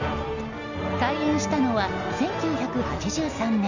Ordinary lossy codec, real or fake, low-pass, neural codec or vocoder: none; real; 7.2 kHz; none